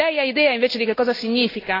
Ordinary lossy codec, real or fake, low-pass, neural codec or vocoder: AAC, 24 kbps; real; 5.4 kHz; none